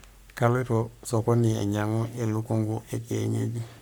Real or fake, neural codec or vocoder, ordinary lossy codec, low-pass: fake; codec, 44.1 kHz, 3.4 kbps, Pupu-Codec; none; none